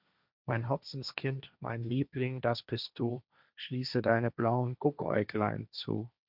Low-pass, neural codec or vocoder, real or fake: 5.4 kHz; codec, 16 kHz, 1.1 kbps, Voila-Tokenizer; fake